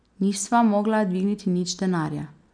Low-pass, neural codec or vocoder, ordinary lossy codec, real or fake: 9.9 kHz; none; none; real